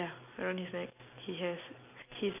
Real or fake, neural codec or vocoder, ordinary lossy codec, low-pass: real; none; none; 3.6 kHz